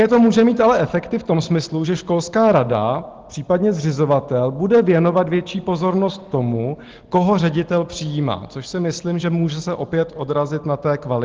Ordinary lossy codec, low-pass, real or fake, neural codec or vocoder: Opus, 16 kbps; 7.2 kHz; real; none